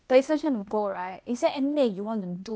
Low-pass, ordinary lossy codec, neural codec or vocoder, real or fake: none; none; codec, 16 kHz, 0.8 kbps, ZipCodec; fake